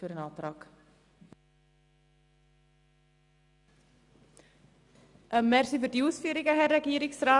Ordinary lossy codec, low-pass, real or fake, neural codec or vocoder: none; 10.8 kHz; real; none